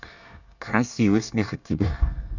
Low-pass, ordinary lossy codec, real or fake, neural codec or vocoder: 7.2 kHz; none; fake; codec, 24 kHz, 1 kbps, SNAC